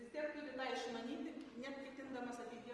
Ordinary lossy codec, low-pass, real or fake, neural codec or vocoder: Opus, 24 kbps; 10.8 kHz; real; none